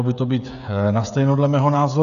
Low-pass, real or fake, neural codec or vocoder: 7.2 kHz; fake; codec, 16 kHz, 16 kbps, FreqCodec, smaller model